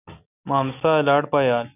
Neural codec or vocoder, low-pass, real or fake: none; 3.6 kHz; real